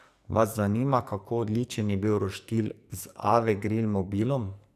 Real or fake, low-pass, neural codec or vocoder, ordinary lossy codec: fake; 14.4 kHz; codec, 44.1 kHz, 2.6 kbps, SNAC; none